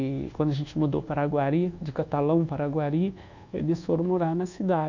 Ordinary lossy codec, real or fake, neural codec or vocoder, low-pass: none; fake; codec, 24 kHz, 1.2 kbps, DualCodec; 7.2 kHz